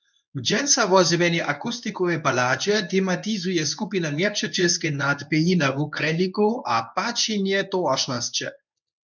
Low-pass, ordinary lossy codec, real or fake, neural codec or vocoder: 7.2 kHz; MP3, 64 kbps; fake; codec, 24 kHz, 0.9 kbps, WavTokenizer, medium speech release version 1